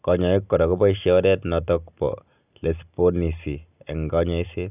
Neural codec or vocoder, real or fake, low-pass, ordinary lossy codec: none; real; 3.6 kHz; none